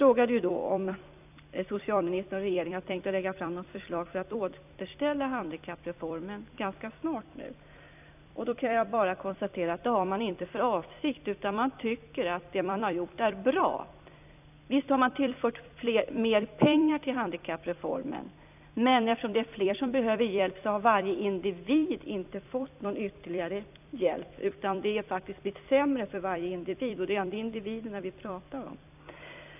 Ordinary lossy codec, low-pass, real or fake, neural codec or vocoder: none; 3.6 kHz; fake; vocoder, 44.1 kHz, 128 mel bands every 256 samples, BigVGAN v2